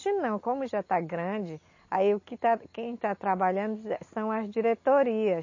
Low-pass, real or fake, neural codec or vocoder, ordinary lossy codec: 7.2 kHz; real; none; MP3, 32 kbps